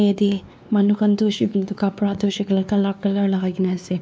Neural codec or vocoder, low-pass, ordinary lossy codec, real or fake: codec, 16 kHz, 2 kbps, X-Codec, WavLM features, trained on Multilingual LibriSpeech; none; none; fake